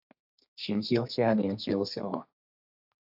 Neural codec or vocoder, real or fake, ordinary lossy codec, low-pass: codec, 32 kHz, 1.9 kbps, SNAC; fake; AAC, 48 kbps; 5.4 kHz